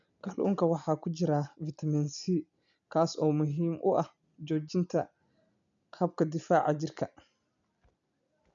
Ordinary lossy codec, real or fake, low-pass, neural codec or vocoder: none; real; 7.2 kHz; none